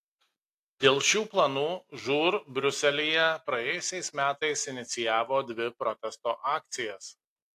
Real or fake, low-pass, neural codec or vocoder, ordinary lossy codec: real; 14.4 kHz; none; AAC, 64 kbps